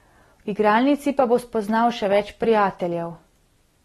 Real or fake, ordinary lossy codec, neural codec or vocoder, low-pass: real; AAC, 32 kbps; none; 19.8 kHz